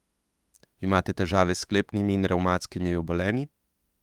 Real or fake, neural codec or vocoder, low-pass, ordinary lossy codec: fake; autoencoder, 48 kHz, 32 numbers a frame, DAC-VAE, trained on Japanese speech; 19.8 kHz; Opus, 32 kbps